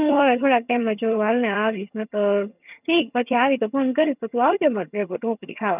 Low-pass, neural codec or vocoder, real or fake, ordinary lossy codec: 3.6 kHz; vocoder, 22.05 kHz, 80 mel bands, HiFi-GAN; fake; none